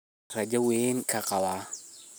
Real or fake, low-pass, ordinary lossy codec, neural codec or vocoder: real; none; none; none